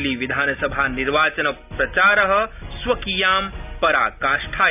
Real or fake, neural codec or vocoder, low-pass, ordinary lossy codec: real; none; 3.6 kHz; none